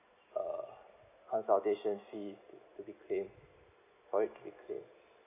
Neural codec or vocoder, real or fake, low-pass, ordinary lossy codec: none; real; 3.6 kHz; none